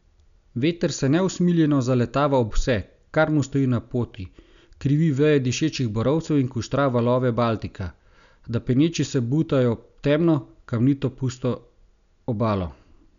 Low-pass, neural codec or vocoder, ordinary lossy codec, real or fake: 7.2 kHz; none; none; real